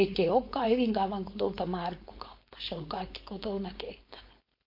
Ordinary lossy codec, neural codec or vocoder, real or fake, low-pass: MP3, 32 kbps; codec, 16 kHz, 4.8 kbps, FACodec; fake; 5.4 kHz